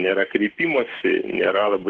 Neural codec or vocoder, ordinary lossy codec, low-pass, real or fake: codec, 44.1 kHz, 7.8 kbps, Pupu-Codec; Opus, 16 kbps; 10.8 kHz; fake